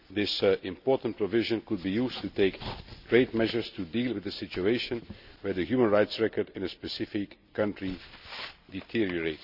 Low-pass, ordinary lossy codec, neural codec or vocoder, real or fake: 5.4 kHz; none; none; real